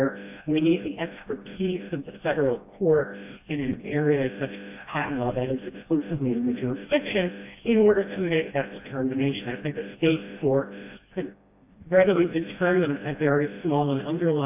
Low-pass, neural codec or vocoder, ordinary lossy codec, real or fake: 3.6 kHz; codec, 16 kHz, 1 kbps, FreqCodec, smaller model; AAC, 32 kbps; fake